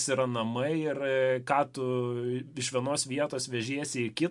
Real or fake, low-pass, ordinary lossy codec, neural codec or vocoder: real; 10.8 kHz; MP3, 64 kbps; none